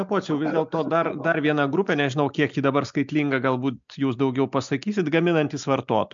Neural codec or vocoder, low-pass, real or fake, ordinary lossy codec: none; 7.2 kHz; real; MP3, 64 kbps